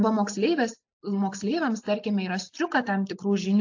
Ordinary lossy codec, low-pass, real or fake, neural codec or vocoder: AAC, 48 kbps; 7.2 kHz; real; none